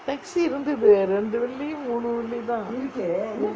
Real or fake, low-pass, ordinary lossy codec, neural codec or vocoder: real; none; none; none